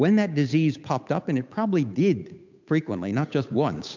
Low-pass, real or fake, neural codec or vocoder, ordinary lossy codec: 7.2 kHz; fake; codec, 16 kHz, 8 kbps, FunCodec, trained on Chinese and English, 25 frames a second; MP3, 64 kbps